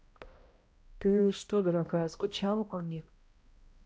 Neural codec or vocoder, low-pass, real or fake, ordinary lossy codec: codec, 16 kHz, 0.5 kbps, X-Codec, HuBERT features, trained on balanced general audio; none; fake; none